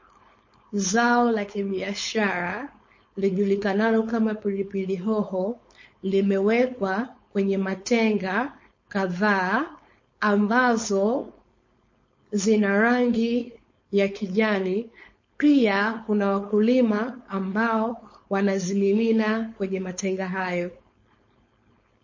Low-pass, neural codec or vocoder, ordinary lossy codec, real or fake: 7.2 kHz; codec, 16 kHz, 4.8 kbps, FACodec; MP3, 32 kbps; fake